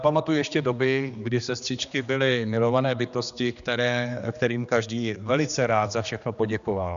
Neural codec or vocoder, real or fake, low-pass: codec, 16 kHz, 2 kbps, X-Codec, HuBERT features, trained on general audio; fake; 7.2 kHz